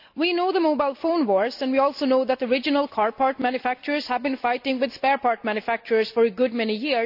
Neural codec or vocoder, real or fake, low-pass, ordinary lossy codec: none; real; 5.4 kHz; AAC, 48 kbps